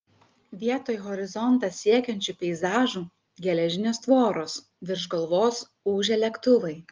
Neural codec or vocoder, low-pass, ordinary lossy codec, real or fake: none; 7.2 kHz; Opus, 24 kbps; real